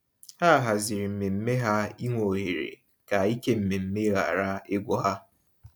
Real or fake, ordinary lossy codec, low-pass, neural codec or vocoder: real; none; 19.8 kHz; none